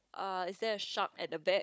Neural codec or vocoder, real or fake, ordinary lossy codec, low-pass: codec, 16 kHz, 16 kbps, FunCodec, trained on Chinese and English, 50 frames a second; fake; none; none